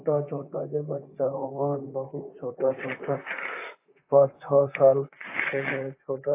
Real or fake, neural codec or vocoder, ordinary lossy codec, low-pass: fake; vocoder, 44.1 kHz, 128 mel bands, Pupu-Vocoder; AAC, 24 kbps; 3.6 kHz